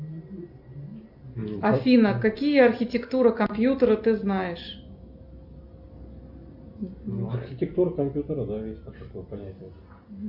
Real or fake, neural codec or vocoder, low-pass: real; none; 5.4 kHz